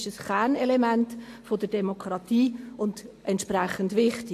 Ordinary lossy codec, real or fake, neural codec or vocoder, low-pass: AAC, 64 kbps; real; none; 14.4 kHz